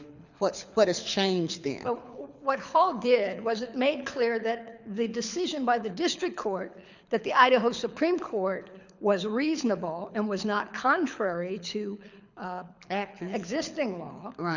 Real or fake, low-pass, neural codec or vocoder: fake; 7.2 kHz; codec, 24 kHz, 6 kbps, HILCodec